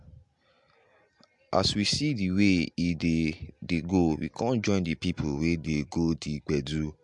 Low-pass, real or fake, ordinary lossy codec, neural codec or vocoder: 10.8 kHz; real; MP3, 64 kbps; none